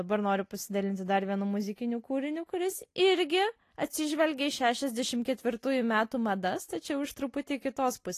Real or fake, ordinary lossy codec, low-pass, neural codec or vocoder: real; AAC, 48 kbps; 14.4 kHz; none